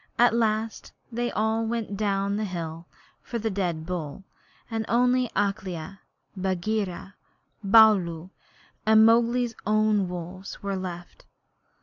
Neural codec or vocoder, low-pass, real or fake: none; 7.2 kHz; real